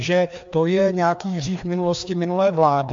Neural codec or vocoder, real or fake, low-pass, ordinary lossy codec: codec, 16 kHz, 2 kbps, FreqCodec, larger model; fake; 7.2 kHz; MP3, 48 kbps